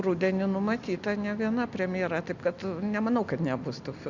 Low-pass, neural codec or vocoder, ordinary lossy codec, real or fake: 7.2 kHz; none; Opus, 64 kbps; real